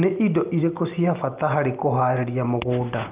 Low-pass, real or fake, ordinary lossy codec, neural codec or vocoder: 3.6 kHz; real; Opus, 32 kbps; none